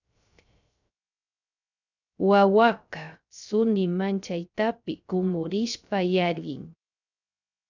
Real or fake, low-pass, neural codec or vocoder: fake; 7.2 kHz; codec, 16 kHz, 0.3 kbps, FocalCodec